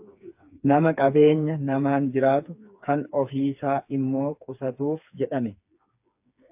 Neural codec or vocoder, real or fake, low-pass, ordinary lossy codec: codec, 16 kHz, 4 kbps, FreqCodec, smaller model; fake; 3.6 kHz; AAC, 32 kbps